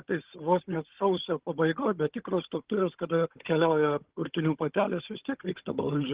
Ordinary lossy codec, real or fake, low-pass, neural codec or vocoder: Opus, 16 kbps; fake; 3.6 kHz; codec, 16 kHz, 16 kbps, FunCodec, trained on Chinese and English, 50 frames a second